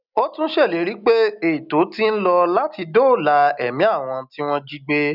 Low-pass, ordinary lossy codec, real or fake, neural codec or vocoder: 5.4 kHz; none; real; none